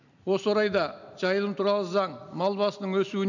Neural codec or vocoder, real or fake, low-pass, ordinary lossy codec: none; real; 7.2 kHz; none